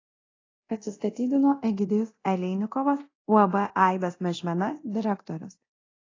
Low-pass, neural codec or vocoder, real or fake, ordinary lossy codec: 7.2 kHz; codec, 24 kHz, 0.9 kbps, DualCodec; fake; AAC, 32 kbps